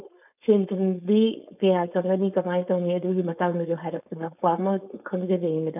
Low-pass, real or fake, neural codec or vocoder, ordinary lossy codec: 3.6 kHz; fake; codec, 16 kHz, 4.8 kbps, FACodec; none